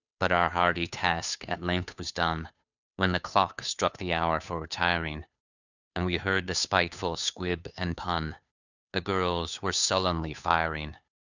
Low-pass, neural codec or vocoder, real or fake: 7.2 kHz; codec, 16 kHz, 2 kbps, FunCodec, trained on Chinese and English, 25 frames a second; fake